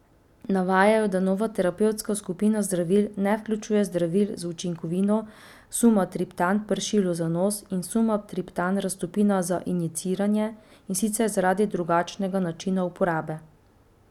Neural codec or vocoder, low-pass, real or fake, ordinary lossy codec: none; 19.8 kHz; real; none